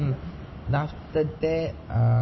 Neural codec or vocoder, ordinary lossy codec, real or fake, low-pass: none; MP3, 24 kbps; real; 7.2 kHz